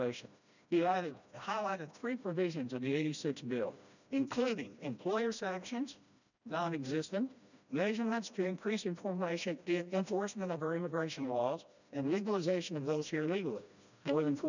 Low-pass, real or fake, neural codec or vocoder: 7.2 kHz; fake; codec, 16 kHz, 1 kbps, FreqCodec, smaller model